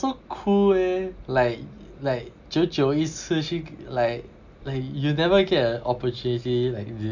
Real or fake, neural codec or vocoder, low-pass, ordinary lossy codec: real; none; 7.2 kHz; none